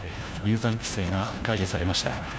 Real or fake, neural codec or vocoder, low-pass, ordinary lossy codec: fake; codec, 16 kHz, 1 kbps, FunCodec, trained on LibriTTS, 50 frames a second; none; none